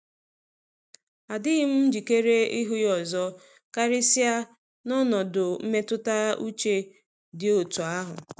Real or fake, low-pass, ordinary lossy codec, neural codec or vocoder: real; none; none; none